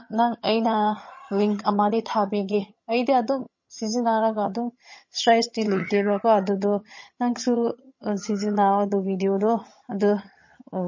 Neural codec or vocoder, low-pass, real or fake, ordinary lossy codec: vocoder, 22.05 kHz, 80 mel bands, HiFi-GAN; 7.2 kHz; fake; MP3, 32 kbps